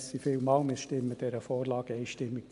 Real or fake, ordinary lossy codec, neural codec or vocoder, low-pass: real; AAC, 96 kbps; none; 10.8 kHz